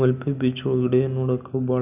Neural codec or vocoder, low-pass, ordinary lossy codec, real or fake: none; 3.6 kHz; none; real